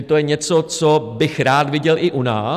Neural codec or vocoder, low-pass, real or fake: none; 14.4 kHz; real